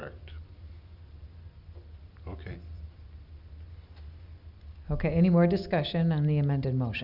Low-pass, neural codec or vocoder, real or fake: 5.4 kHz; none; real